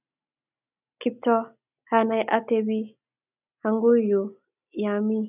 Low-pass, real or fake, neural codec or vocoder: 3.6 kHz; real; none